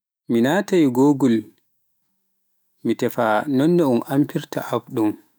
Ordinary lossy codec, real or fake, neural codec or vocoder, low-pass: none; fake; autoencoder, 48 kHz, 128 numbers a frame, DAC-VAE, trained on Japanese speech; none